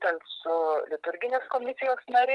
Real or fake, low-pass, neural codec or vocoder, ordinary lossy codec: real; 10.8 kHz; none; Opus, 24 kbps